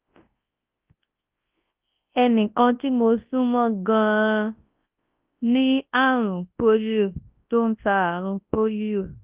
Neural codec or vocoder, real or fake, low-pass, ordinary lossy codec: codec, 24 kHz, 0.9 kbps, WavTokenizer, large speech release; fake; 3.6 kHz; Opus, 32 kbps